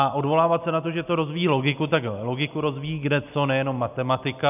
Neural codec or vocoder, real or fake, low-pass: none; real; 3.6 kHz